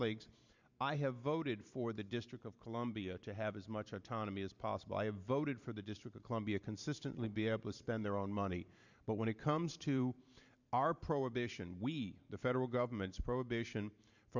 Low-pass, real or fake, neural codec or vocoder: 7.2 kHz; fake; vocoder, 44.1 kHz, 128 mel bands every 512 samples, BigVGAN v2